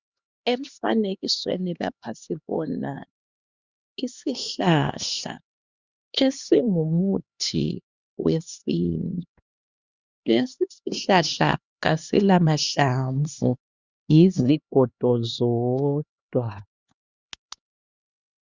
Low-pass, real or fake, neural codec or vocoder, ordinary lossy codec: 7.2 kHz; fake; codec, 16 kHz, 2 kbps, X-Codec, HuBERT features, trained on LibriSpeech; Opus, 64 kbps